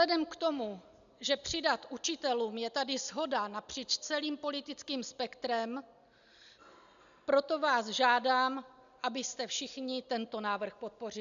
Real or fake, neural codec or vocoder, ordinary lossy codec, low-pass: real; none; AAC, 96 kbps; 7.2 kHz